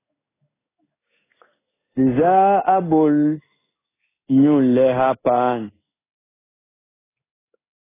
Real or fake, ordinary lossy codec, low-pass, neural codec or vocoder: fake; AAC, 16 kbps; 3.6 kHz; codec, 16 kHz in and 24 kHz out, 1 kbps, XY-Tokenizer